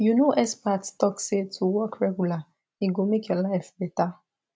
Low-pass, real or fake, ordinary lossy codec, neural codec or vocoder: none; real; none; none